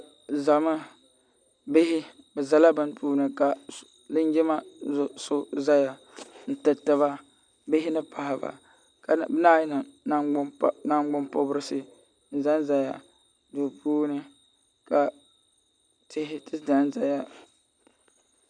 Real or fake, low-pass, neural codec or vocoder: real; 9.9 kHz; none